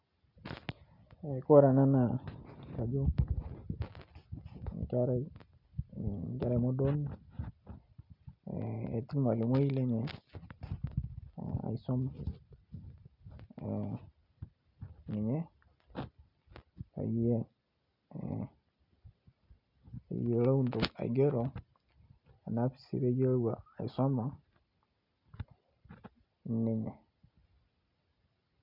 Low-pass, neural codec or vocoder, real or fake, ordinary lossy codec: 5.4 kHz; none; real; none